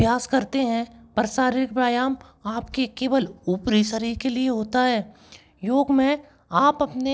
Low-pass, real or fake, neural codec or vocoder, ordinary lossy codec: none; real; none; none